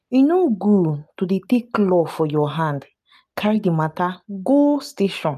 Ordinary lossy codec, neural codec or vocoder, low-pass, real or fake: none; none; 14.4 kHz; real